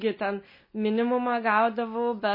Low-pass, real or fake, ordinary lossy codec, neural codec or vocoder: 5.4 kHz; real; MP3, 24 kbps; none